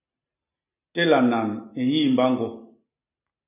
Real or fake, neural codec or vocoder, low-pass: real; none; 3.6 kHz